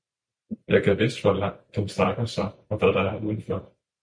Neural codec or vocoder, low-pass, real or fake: vocoder, 44.1 kHz, 128 mel bands every 512 samples, BigVGAN v2; 9.9 kHz; fake